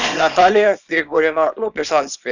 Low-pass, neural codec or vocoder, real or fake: 7.2 kHz; codec, 24 kHz, 0.9 kbps, WavTokenizer, small release; fake